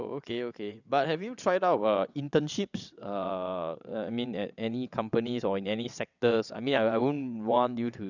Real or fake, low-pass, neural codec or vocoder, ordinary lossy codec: fake; 7.2 kHz; vocoder, 22.05 kHz, 80 mel bands, WaveNeXt; none